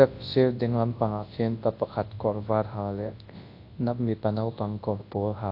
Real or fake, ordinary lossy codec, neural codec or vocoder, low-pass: fake; AAC, 32 kbps; codec, 24 kHz, 0.9 kbps, WavTokenizer, large speech release; 5.4 kHz